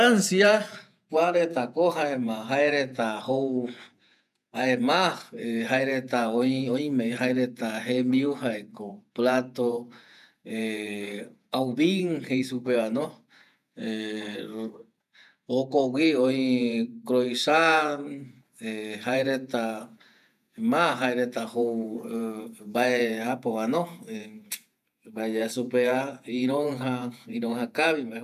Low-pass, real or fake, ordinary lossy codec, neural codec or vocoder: 14.4 kHz; fake; none; vocoder, 48 kHz, 128 mel bands, Vocos